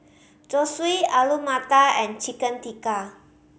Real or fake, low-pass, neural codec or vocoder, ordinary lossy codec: real; none; none; none